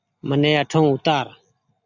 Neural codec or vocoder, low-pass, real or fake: none; 7.2 kHz; real